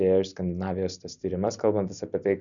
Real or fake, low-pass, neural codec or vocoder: real; 7.2 kHz; none